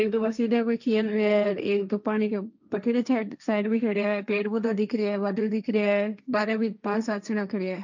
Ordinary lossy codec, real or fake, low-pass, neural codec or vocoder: none; fake; 7.2 kHz; codec, 16 kHz, 1.1 kbps, Voila-Tokenizer